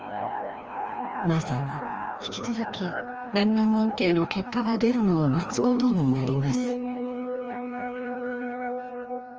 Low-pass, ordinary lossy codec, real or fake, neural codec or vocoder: 7.2 kHz; Opus, 24 kbps; fake; codec, 16 kHz, 1 kbps, FreqCodec, larger model